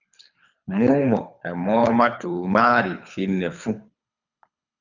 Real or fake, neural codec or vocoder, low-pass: fake; codec, 24 kHz, 3 kbps, HILCodec; 7.2 kHz